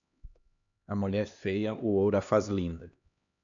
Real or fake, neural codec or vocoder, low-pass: fake; codec, 16 kHz, 2 kbps, X-Codec, HuBERT features, trained on LibriSpeech; 7.2 kHz